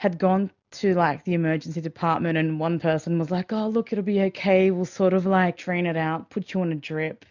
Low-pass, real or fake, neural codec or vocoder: 7.2 kHz; real; none